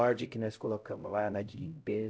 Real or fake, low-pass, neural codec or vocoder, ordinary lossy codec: fake; none; codec, 16 kHz, 0.5 kbps, X-Codec, HuBERT features, trained on LibriSpeech; none